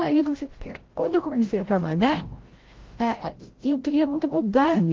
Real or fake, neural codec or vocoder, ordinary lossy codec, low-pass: fake; codec, 16 kHz, 0.5 kbps, FreqCodec, larger model; Opus, 16 kbps; 7.2 kHz